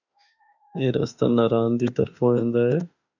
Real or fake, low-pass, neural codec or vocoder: fake; 7.2 kHz; autoencoder, 48 kHz, 32 numbers a frame, DAC-VAE, trained on Japanese speech